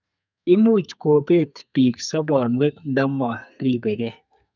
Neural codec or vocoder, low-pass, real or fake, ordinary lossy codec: codec, 32 kHz, 1.9 kbps, SNAC; 7.2 kHz; fake; none